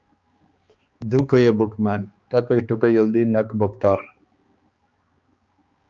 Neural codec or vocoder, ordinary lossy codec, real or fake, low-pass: codec, 16 kHz, 2 kbps, X-Codec, HuBERT features, trained on balanced general audio; Opus, 24 kbps; fake; 7.2 kHz